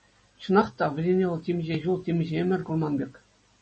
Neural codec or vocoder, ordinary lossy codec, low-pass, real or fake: none; MP3, 32 kbps; 10.8 kHz; real